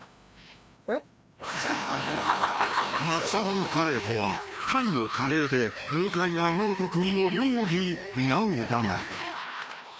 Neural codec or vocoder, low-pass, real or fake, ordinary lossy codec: codec, 16 kHz, 1 kbps, FreqCodec, larger model; none; fake; none